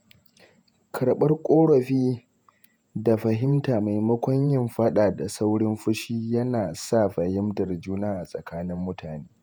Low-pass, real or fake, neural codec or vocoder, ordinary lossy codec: none; fake; vocoder, 48 kHz, 128 mel bands, Vocos; none